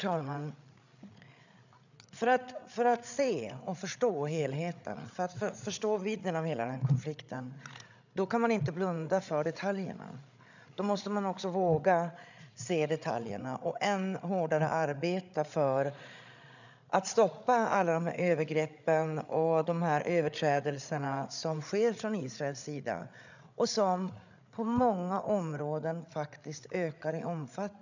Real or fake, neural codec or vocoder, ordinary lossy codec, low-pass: fake; codec, 16 kHz, 8 kbps, FreqCodec, larger model; none; 7.2 kHz